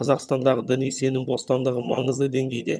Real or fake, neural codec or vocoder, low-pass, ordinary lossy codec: fake; vocoder, 22.05 kHz, 80 mel bands, HiFi-GAN; none; none